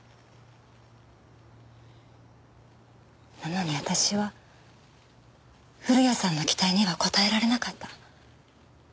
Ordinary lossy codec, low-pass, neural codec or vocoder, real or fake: none; none; none; real